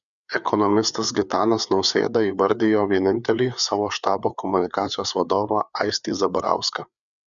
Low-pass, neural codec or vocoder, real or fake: 7.2 kHz; codec, 16 kHz, 4 kbps, FreqCodec, larger model; fake